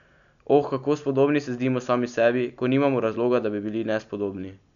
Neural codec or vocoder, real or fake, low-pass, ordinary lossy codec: none; real; 7.2 kHz; none